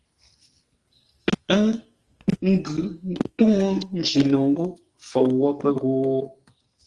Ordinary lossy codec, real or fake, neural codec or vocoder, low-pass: Opus, 24 kbps; fake; codec, 44.1 kHz, 2.6 kbps, SNAC; 10.8 kHz